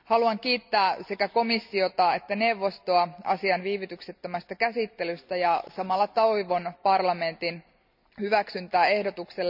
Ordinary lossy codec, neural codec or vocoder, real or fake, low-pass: none; none; real; 5.4 kHz